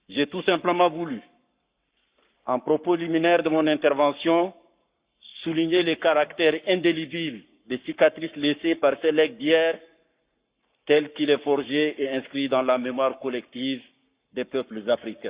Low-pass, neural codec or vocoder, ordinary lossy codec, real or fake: 3.6 kHz; codec, 44.1 kHz, 7.8 kbps, Pupu-Codec; Opus, 64 kbps; fake